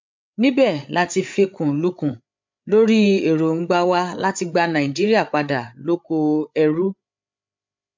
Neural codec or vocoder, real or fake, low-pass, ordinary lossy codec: codec, 16 kHz, 8 kbps, FreqCodec, larger model; fake; 7.2 kHz; MP3, 64 kbps